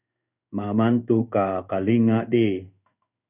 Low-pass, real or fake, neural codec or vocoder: 3.6 kHz; fake; codec, 16 kHz in and 24 kHz out, 1 kbps, XY-Tokenizer